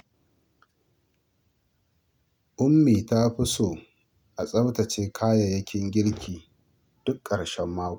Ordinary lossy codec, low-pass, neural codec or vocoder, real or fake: none; 19.8 kHz; none; real